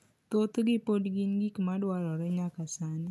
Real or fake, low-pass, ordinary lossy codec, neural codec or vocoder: real; none; none; none